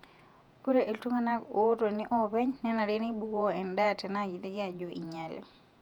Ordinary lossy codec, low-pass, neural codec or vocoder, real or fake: none; 19.8 kHz; vocoder, 48 kHz, 128 mel bands, Vocos; fake